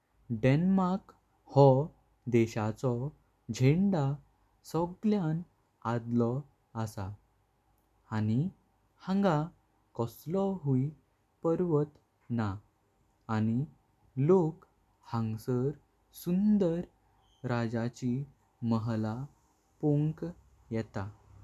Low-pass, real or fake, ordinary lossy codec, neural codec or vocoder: 14.4 kHz; real; none; none